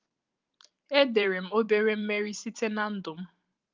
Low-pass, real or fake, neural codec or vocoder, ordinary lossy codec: 7.2 kHz; fake; vocoder, 44.1 kHz, 128 mel bands every 512 samples, BigVGAN v2; Opus, 32 kbps